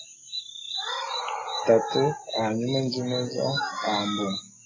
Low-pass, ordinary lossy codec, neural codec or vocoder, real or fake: 7.2 kHz; AAC, 32 kbps; none; real